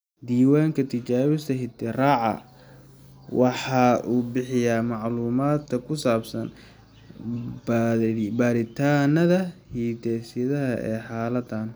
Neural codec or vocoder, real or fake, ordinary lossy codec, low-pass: none; real; none; none